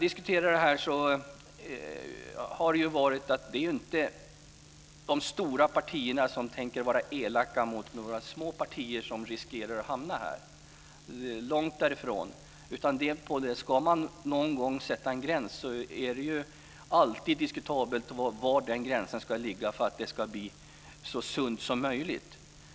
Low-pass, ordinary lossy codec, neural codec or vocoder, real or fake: none; none; none; real